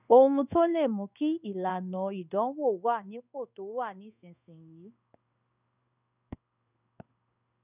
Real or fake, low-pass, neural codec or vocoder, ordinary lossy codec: fake; 3.6 kHz; codec, 16 kHz in and 24 kHz out, 1 kbps, XY-Tokenizer; none